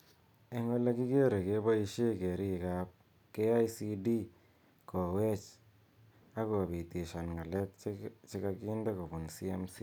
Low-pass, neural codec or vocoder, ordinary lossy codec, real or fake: 19.8 kHz; none; none; real